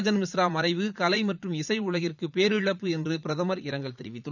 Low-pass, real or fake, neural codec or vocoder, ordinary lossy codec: 7.2 kHz; fake; vocoder, 44.1 kHz, 80 mel bands, Vocos; none